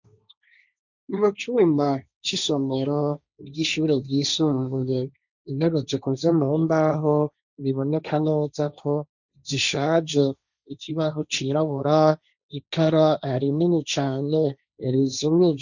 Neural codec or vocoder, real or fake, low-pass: codec, 16 kHz, 1.1 kbps, Voila-Tokenizer; fake; 7.2 kHz